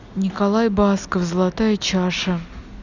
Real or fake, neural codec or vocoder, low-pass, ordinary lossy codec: real; none; 7.2 kHz; none